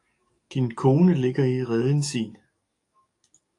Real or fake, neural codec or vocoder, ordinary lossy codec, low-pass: fake; codec, 44.1 kHz, 7.8 kbps, DAC; AAC, 48 kbps; 10.8 kHz